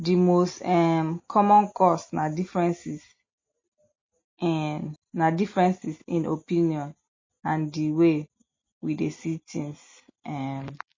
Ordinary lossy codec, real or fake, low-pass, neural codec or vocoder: MP3, 32 kbps; real; 7.2 kHz; none